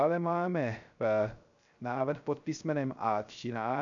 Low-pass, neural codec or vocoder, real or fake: 7.2 kHz; codec, 16 kHz, 0.3 kbps, FocalCodec; fake